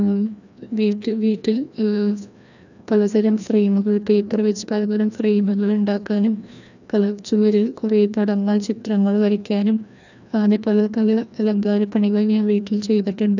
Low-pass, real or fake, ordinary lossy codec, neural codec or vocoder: 7.2 kHz; fake; none; codec, 16 kHz, 1 kbps, FreqCodec, larger model